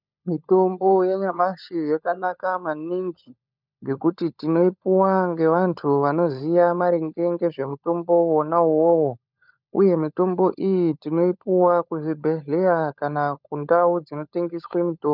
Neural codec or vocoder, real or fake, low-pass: codec, 16 kHz, 16 kbps, FunCodec, trained on LibriTTS, 50 frames a second; fake; 5.4 kHz